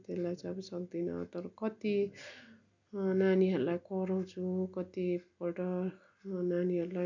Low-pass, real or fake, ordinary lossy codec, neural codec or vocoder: 7.2 kHz; real; none; none